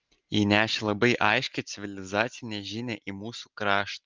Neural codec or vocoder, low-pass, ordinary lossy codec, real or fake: none; 7.2 kHz; Opus, 32 kbps; real